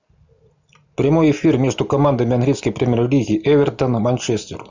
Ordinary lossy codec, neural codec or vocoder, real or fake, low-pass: Opus, 64 kbps; none; real; 7.2 kHz